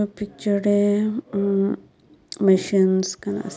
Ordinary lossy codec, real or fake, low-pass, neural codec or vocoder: none; real; none; none